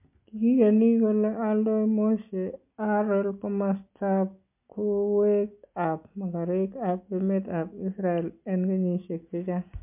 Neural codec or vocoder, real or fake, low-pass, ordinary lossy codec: none; real; 3.6 kHz; MP3, 32 kbps